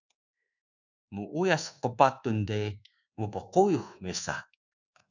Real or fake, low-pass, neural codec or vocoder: fake; 7.2 kHz; codec, 24 kHz, 1.2 kbps, DualCodec